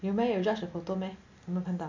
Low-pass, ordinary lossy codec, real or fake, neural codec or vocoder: 7.2 kHz; AAC, 48 kbps; real; none